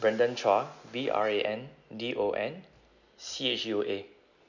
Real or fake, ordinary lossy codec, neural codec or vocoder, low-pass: real; none; none; 7.2 kHz